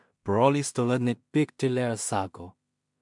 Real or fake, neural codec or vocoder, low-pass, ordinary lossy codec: fake; codec, 16 kHz in and 24 kHz out, 0.4 kbps, LongCat-Audio-Codec, two codebook decoder; 10.8 kHz; MP3, 64 kbps